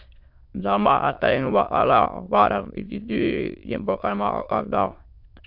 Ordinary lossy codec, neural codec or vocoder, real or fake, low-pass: MP3, 48 kbps; autoencoder, 22.05 kHz, a latent of 192 numbers a frame, VITS, trained on many speakers; fake; 5.4 kHz